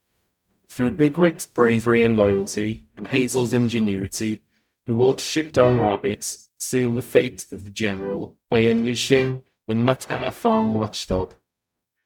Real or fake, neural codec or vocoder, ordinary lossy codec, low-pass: fake; codec, 44.1 kHz, 0.9 kbps, DAC; none; 19.8 kHz